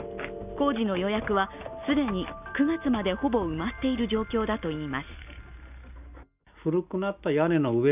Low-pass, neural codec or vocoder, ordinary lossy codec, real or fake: 3.6 kHz; none; none; real